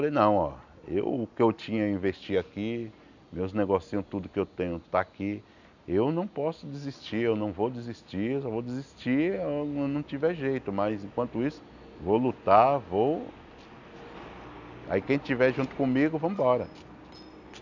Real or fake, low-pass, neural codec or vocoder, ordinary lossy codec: real; 7.2 kHz; none; none